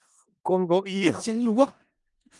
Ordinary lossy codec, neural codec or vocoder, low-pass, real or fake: Opus, 32 kbps; codec, 16 kHz in and 24 kHz out, 0.4 kbps, LongCat-Audio-Codec, four codebook decoder; 10.8 kHz; fake